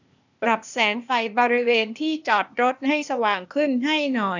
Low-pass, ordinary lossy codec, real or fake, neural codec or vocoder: 7.2 kHz; none; fake; codec, 16 kHz, 0.8 kbps, ZipCodec